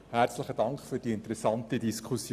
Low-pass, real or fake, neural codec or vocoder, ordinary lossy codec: 14.4 kHz; real; none; Opus, 64 kbps